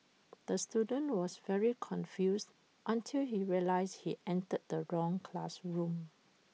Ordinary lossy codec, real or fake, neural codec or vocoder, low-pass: none; real; none; none